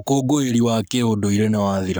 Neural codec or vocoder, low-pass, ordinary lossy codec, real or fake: codec, 44.1 kHz, 7.8 kbps, Pupu-Codec; none; none; fake